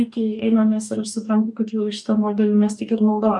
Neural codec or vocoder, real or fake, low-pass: codec, 44.1 kHz, 2.6 kbps, DAC; fake; 10.8 kHz